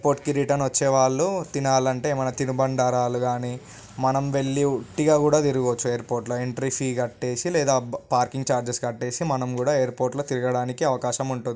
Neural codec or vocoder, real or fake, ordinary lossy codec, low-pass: none; real; none; none